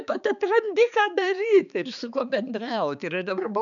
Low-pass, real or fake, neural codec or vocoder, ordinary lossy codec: 7.2 kHz; fake; codec, 16 kHz, 4 kbps, X-Codec, HuBERT features, trained on balanced general audio; MP3, 96 kbps